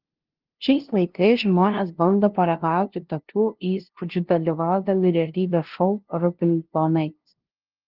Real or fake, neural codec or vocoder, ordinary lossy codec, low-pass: fake; codec, 16 kHz, 0.5 kbps, FunCodec, trained on LibriTTS, 25 frames a second; Opus, 16 kbps; 5.4 kHz